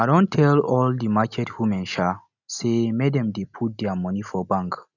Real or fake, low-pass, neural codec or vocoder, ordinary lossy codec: real; 7.2 kHz; none; none